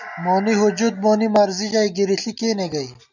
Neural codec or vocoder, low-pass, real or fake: none; 7.2 kHz; real